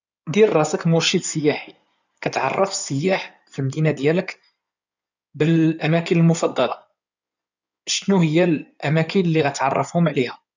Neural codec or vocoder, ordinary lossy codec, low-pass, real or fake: codec, 16 kHz in and 24 kHz out, 2.2 kbps, FireRedTTS-2 codec; none; 7.2 kHz; fake